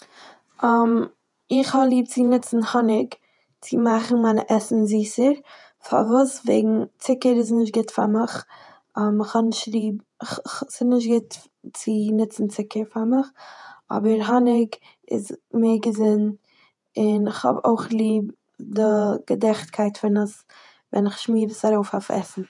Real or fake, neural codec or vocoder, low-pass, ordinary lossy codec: fake; vocoder, 48 kHz, 128 mel bands, Vocos; 10.8 kHz; none